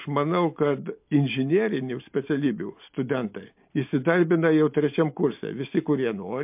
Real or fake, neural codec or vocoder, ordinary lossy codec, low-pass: real; none; MP3, 32 kbps; 3.6 kHz